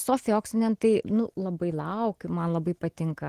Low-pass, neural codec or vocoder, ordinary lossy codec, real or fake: 14.4 kHz; none; Opus, 16 kbps; real